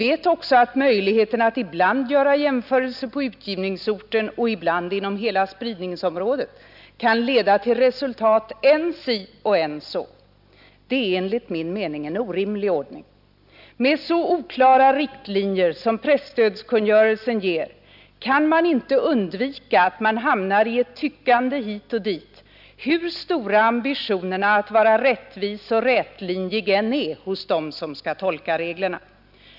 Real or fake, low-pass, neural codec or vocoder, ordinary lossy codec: real; 5.4 kHz; none; none